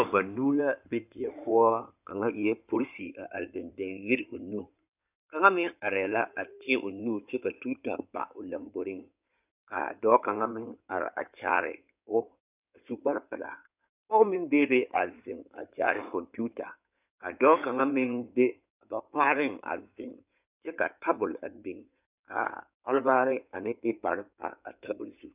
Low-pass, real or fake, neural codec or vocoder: 3.6 kHz; fake; codec, 16 kHz in and 24 kHz out, 2.2 kbps, FireRedTTS-2 codec